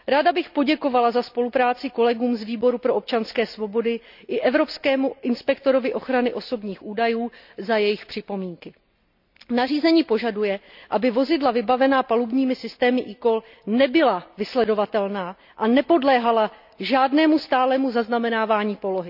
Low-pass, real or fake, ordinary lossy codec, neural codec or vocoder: 5.4 kHz; real; none; none